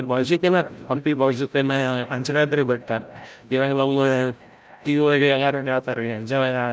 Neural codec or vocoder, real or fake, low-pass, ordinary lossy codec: codec, 16 kHz, 0.5 kbps, FreqCodec, larger model; fake; none; none